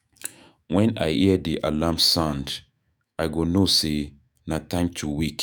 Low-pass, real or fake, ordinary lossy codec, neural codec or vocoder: none; fake; none; autoencoder, 48 kHz, 128 numbers a frame, DAC-VAE, trained on Japanese speech